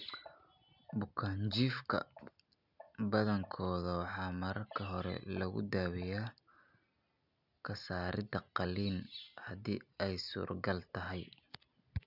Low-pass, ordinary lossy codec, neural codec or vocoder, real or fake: 5.4 kHz; none; none; real